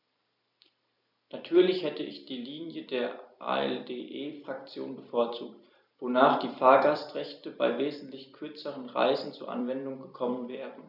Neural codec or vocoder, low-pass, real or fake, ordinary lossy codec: vocoder, 44.1 kHz, 128 mel bands every 256 samples, BigVGAN v2; 5.4 kHz; fake; none